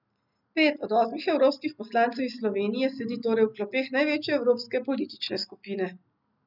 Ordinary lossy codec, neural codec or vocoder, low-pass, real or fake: none; none; 5.4 kHz; real